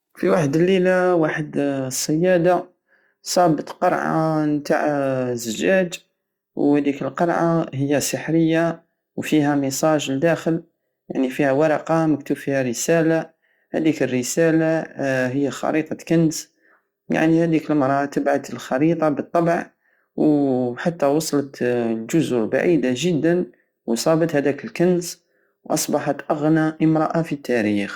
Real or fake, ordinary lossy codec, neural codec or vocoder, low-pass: real; Opus, 64 kbps; none; 19.8 kHz